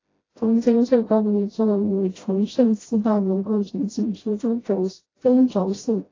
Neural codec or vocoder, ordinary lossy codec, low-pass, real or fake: codec, 16 kHz, 0.5 kbps, FreqCodec, smaller model; AAC, 32 kbps; 7.2 kHz; fake